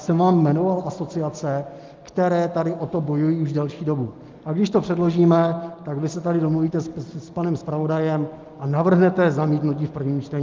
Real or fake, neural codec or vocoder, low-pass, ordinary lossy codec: real; none; 7.2 kHz; Opus, 32 kbps